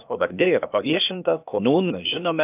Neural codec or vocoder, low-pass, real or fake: codec, 16 kHz, 0.8 kbps, ZipCodec; 3.6 kHz; fake